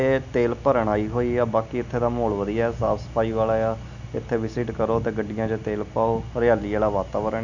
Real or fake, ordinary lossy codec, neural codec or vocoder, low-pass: real; none; none; 7.2 kHz